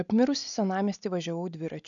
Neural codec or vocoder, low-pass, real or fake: none; 7.2 kHz; real